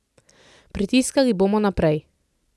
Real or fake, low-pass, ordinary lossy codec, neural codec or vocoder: real; none; none; none